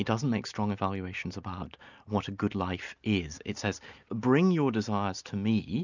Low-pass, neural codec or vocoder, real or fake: 7.2 kHz; none; real